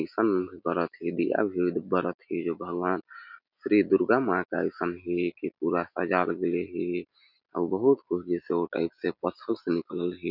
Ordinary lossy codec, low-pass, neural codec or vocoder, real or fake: none; 5.4 kHz; none; real